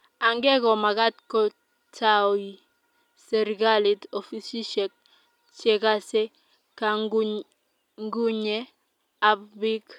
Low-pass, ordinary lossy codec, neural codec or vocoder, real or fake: 19.8 kHz; none; none; real